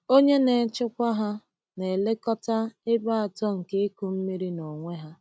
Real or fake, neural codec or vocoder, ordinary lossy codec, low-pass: real; none; none; none